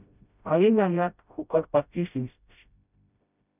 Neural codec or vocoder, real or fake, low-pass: codec, 16 kHz, 0.5 kbps, FreqCodec, smaller model; fake; 3.6 kHz